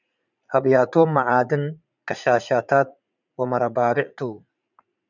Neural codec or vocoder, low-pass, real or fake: vocoder, 44.1 kHz, 80 mel bands, Vocos; 7.2 kHz; fake